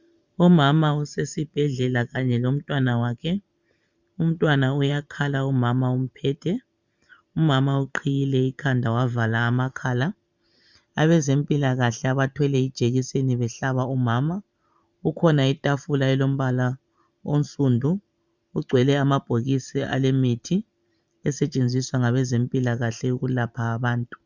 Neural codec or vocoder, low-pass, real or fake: vocoder, 44.1 kHz, 128 mel bands every 512 samples, BigVGAN v2; 7.2 kHz; fake